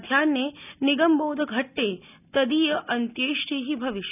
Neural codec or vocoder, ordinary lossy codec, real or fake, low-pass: none; none; real; 3.6 kHz